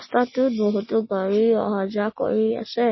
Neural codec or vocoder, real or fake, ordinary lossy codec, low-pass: none; real; MP3, 24 kbps; 7.2 kHz